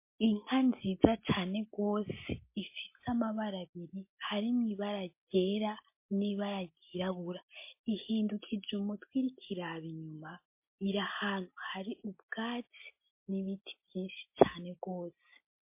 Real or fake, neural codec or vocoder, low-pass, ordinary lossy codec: real; none; 3.6 kHz; MP3, 24 kbps